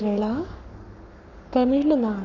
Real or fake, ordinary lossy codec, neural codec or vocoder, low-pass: fake; none; codec, 44.1 kHz, 7.8 kbps, Pupu-Codec; 7.2 kHz